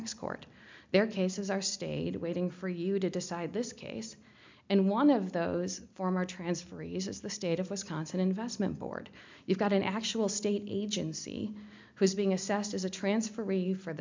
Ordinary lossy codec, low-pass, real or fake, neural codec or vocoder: MP3, 64 kbps; 7.2 kHz; real; none